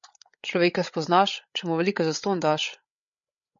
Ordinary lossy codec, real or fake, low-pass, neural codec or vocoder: MP3, 64 kbps; fake; 7.2 kHz; codec, 16 kHz, 8 kbps, FreqCodec, larger model